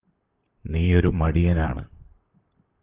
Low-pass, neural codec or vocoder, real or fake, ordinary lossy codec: 3.6 kHz; vocoder, 44.1 kHz, 128 mel bands, Pupu-Vocoder; fake; Opus, 32 kbps